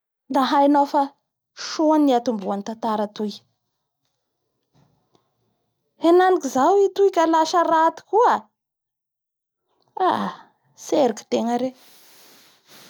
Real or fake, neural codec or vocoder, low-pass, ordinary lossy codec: real; none; none; none